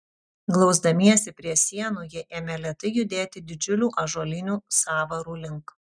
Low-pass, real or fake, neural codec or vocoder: 9.9 kHz; real; none